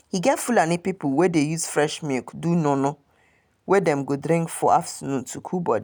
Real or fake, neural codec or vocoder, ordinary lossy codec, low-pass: real; none; none; none